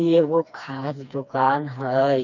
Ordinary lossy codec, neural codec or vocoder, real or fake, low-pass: none; codec, 16 kHz, 2 kbps, FreqCodec, smaller model; fake; 7.2 kHz